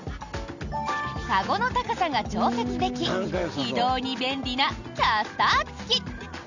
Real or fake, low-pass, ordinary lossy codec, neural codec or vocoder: real; 7.2 kHz; none; none